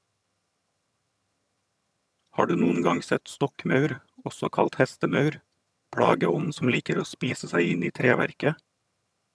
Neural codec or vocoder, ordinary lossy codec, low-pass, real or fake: vocoder, 22.05 kHz, 80 mel bands, HiFi-GAN; none; none; fake